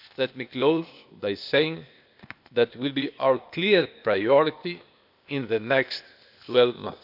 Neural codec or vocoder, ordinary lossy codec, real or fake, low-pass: codec, 16 kHz, 0.8 kbps, ZipCodec; none; fake; 5.4 kHz